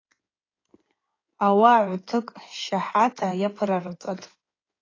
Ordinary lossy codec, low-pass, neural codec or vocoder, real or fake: AAC, 32 kbps; 7.2 kHz; codec, 16 kHz in and 24 kHz out, 2.2 kbps, FireRedTTS-2 codec; fake